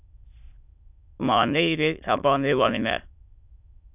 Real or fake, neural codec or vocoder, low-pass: fake; autoencoder, 22.05 kHz, a latent of 192 numbers a frame, VITS, trained on many speakers; 3.6 kHz